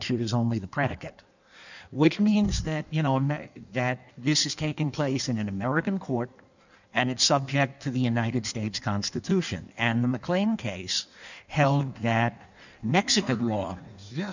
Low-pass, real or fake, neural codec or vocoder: 7.2 kHz; fake; codec, 16 kHz in and 24 kHz out, 1.1 kbps, FireRedTTS-2 codec